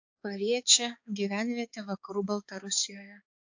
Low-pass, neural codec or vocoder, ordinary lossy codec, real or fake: 7.2 kHz; codec, 16 kHz, 4 kbps, X-Codec, HuBERT features, trained on balanced general audio; AAC, 48 kbps; fake